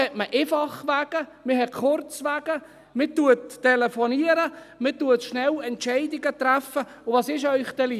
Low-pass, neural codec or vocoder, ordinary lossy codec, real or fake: 14.4 kHz; none; none; real